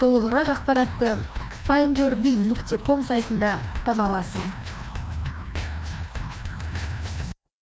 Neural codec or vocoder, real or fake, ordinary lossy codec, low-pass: codec, 16 kHz, 1 kbps, FreqCodec, larger model; fake; none; none